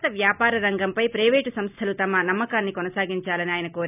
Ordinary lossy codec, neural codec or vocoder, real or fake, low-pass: none; none; real; 3.6 kHz